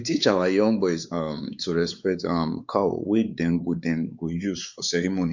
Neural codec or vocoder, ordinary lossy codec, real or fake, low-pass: codec, 16 kHz, 4 kbps, X-Codec, WavLM features, trained on Multilingual LibriSpeech; Opus, 64 kbps; fake; 7.2 kHz